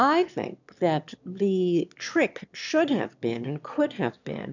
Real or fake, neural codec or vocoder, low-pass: fake; autoencoder, 22.05 kHz, a latent of 192 numbers a frame, VITS, trained on one speaker; 7.2 kHz